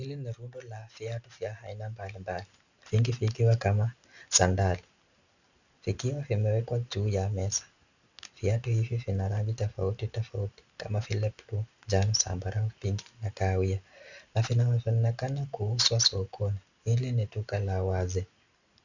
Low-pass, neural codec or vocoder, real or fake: 7.2 kHz; none; real